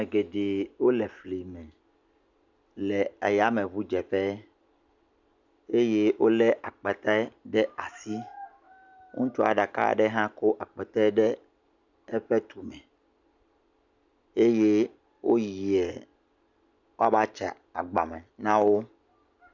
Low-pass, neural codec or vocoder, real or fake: 7.2 kHz; none; real